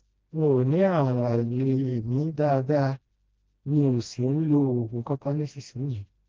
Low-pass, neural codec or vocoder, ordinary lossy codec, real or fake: 7.2 kHz; codec, 16 kHz, 1 kbps, FreqCodec, smaller model; Opus, 24 kbps; fake